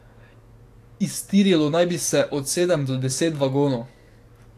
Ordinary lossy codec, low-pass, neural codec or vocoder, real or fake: AAC, 64 kbps; 14.4 kHz; codec, 44.1 kHz, 7.8 kbps, DAC; fake